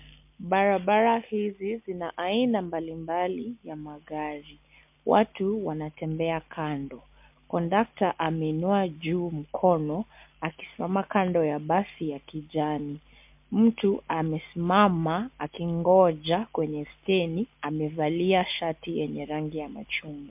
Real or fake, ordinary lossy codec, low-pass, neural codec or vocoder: real; MP3, 32 kbps; 3.6 kHz; none